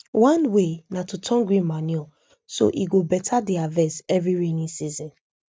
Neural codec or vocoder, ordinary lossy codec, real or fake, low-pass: none; none; real; none